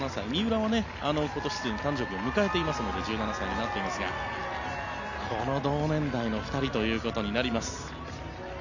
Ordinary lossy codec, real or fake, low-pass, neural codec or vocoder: none; real; 7.2 kHz; none